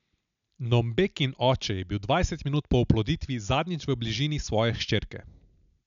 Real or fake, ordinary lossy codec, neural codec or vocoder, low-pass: real; none; none; 7.2 kHz